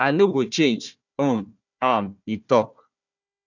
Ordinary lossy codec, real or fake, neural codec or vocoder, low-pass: none; fake; codec, 16 kHz, 1 kbps, FunCodec, trained on Chinese and English, 50 frames a second; 7.2 kHz